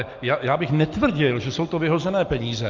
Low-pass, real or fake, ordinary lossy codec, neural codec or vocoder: 7.2 kHz; real; Opus, 32 kbps; none